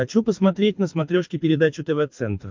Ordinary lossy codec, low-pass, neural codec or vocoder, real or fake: MP3, 64 kbps; 7.2 kHz; codec, 24 kHz, 6 kbps, HILCodec; fake